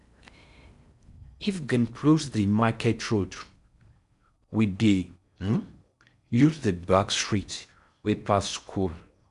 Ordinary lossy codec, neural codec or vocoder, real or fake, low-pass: none; codec, 16 kHz in and 24 kHz out, 0.6 kbps, FocalCodec, streaming, 4096 codes; fake; 10.8 kHz